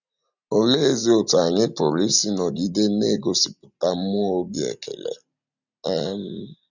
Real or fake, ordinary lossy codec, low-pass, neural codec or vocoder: real; none; 7.2 kHz; none